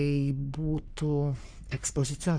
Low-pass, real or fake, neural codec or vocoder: 9.9 kHz; fake; codec, 44.1 kHz, 3.4 kbps, Pupu-Codec